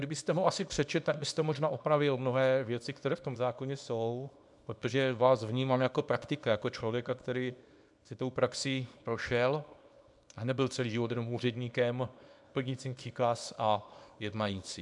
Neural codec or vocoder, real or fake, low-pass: codec, 24 kHz, 0.9 kbps, WavTokenizer, small release; fake; 10.8 kHz